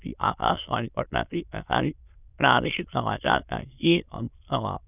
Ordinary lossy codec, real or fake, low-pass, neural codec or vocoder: none; fake; 3.6 kHz; autoencoder, 22.05 kHz, a latent of 192 numbers a frame, VITS, trained on many speakers